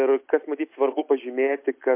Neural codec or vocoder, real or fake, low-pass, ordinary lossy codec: none; real; 3.6 kHz; MP3, 32 kbps